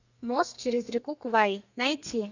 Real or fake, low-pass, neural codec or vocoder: fake; 7.2 kHz; codec, 32 kHz, 1.9 kbps, SNAC